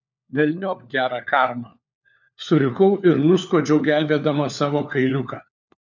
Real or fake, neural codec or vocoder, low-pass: fake; codec, 16 kHz, 4 kbps, FunCodec, trained on LibriTTS, 50 frames a second; 7.2 kHz